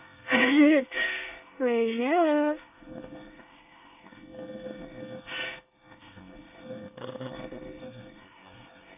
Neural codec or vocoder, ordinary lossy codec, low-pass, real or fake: codec, 24 kHz, 1 kbps, SNAC; none; 3.6 kHz; fake